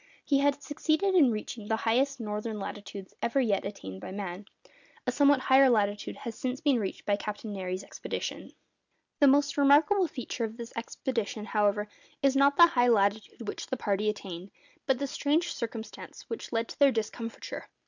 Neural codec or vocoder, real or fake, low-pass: none; real; 7.2 kHz